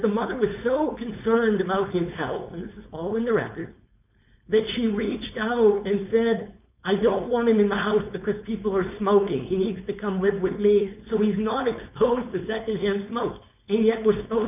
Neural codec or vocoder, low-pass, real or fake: codec, 16 kHz, 4.8 kbps, FACodec; 3.6 kHz; fake